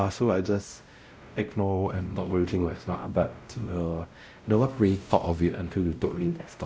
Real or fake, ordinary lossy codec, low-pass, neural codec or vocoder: fake; none; none; codec, 16 kHz, 0.5 kbps, X-Codec, WavLM features, trained on Multilingual LibriSpeech